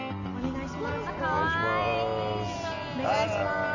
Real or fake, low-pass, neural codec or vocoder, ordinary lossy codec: real; 7.2 kHz; none; none